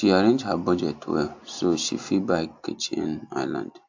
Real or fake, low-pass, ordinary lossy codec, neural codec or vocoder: real; 7.2 kHz; AAC, 48 kbps; none